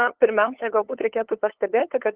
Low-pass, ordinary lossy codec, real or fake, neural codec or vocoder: 3.6 kHz; Opus, 16 kbps; fake; codec, 16 kHz, 8 kbps, FunCodec, trained on LibriTTS, 25 frames a second